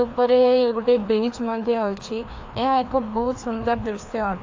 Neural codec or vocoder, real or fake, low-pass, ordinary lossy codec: codec, 16 kHz, 2 kbps, FreqCodec, larger model; fake; 7.2 kHz; AAC, 48 kbps